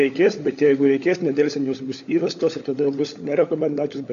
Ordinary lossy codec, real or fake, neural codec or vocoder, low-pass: AAC, 48 kbps; fake; codec, 16 kHz, 16 kbps, FunCodec, trained on LibriTTS, 50 frames a second; 7.2 kHz